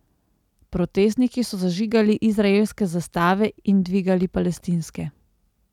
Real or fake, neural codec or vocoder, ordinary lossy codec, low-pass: real; none; none; 19.8 kHz